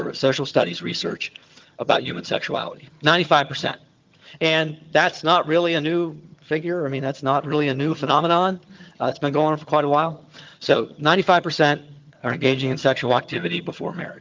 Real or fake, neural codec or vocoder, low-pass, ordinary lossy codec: fake; vocoder, 22.05 kHz, 80 mel bands, HiFi-GAN; 7.2 kHz; Opus, 32 kbps